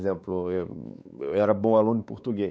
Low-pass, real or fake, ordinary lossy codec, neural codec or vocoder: none; fake; none; codec, 16 kHz, 4 kbps, X-Codec, WavLM features, trained on Multilingual LibriSpeech